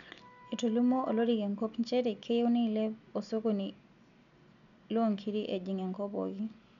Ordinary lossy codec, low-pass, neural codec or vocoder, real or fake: none; 7.2 kHz; none; real